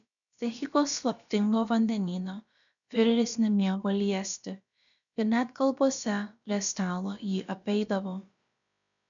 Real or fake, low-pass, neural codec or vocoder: fake; 7.2 kHz; codec, 16 kHz, about 1 kbps, DyCAST, with the encoder's durations